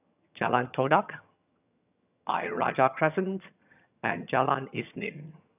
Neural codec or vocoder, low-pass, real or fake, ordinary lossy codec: vocoder, 22.05 kHz, 80 mel bands, HiFi-GAN; 3.6 kHz; fake; none